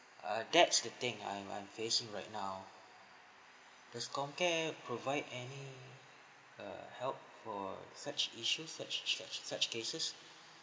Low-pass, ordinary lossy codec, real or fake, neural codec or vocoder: none; none; real; none